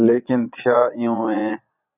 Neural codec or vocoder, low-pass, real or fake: vocoder, 22.05 kHz, 80 mel bands, Vocos; 3.6 kHz; fake